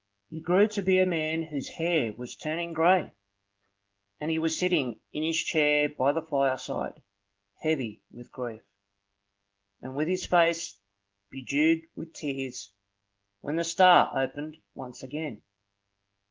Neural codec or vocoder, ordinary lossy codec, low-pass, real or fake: none; Opus, 16 kbps; 7.2 kHz; real